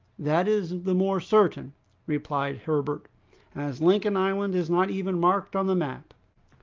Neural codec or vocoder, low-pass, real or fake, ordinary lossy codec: none; 7.2 kHz; real; Opus, 32 kbps